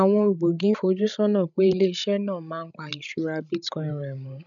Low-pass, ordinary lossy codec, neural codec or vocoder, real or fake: 7.2 kHz; none; codec, 16 kHz, 16 kbps, FreqCodec, larger model; fake